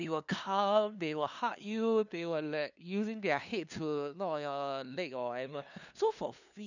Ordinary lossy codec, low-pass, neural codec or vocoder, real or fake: none; 7.2 kHz; codec, 16 kHz, 2 kbps, FunCodec, trained on LibriTTS, 25 frames a second; fake